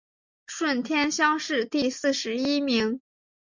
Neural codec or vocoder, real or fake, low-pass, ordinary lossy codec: none; real; 7.2 kHz; MP3, 64 kbps